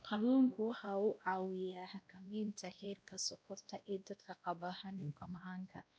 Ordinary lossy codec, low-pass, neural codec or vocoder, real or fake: none; none; codec, 16 kHz, 1 kbps, X-Codec, WavLM features, trained on Multilingual LibriSpeech; fake